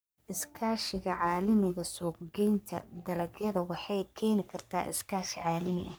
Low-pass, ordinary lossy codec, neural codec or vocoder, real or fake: none; none; codec, 44.1 kHz, 3.4 kbps, Pupu-Codec; fake